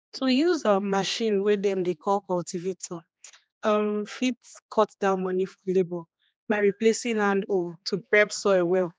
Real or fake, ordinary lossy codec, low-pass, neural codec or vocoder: fake; none; none; codec, 16 kHz, 2 kbps, X-Codec, HuBERT features, trained on general audio